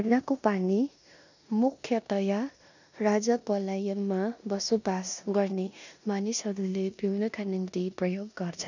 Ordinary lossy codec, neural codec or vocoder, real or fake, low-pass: none; codec, 16 kHz in and 24 kHz out, 0.9 kbps, LongCat-Audio-Codec, four codebook decoder; fake; 7.2 kHz